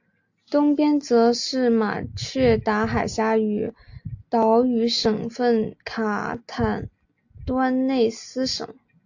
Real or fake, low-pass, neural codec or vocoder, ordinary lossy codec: real; 7.2 kHz; none; AAC, 48 kbps